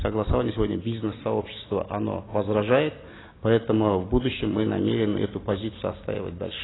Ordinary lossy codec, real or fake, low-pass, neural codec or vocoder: AAC, 16 kbps; real; 7.2 kHz; none